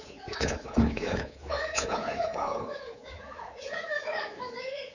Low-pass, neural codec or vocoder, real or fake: 7.2 kHz; codec, 24 kHz, 3.1 kbps, DualCodec; fake